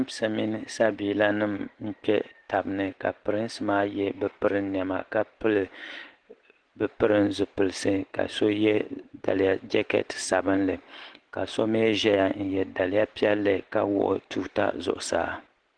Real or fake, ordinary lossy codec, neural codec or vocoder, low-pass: real; Opus, 16 kbps; none; 9.9 kHz